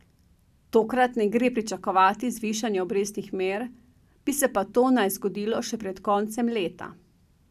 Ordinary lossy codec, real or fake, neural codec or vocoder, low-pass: none; real; none; 14.4 kHz